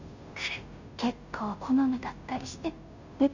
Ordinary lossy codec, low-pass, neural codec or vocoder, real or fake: none; 7.2 kHz; codec, 16 kHz, 0.5 kbps, FunCodec, trained on Chinese and English, 25 frames a second; fake